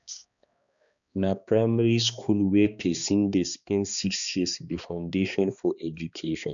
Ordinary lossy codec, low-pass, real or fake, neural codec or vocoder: none; 7.2 kHz; fake; codec, 16 kHz, 2 kbps, X-Codec, HuBERT features, trained on balanced general audio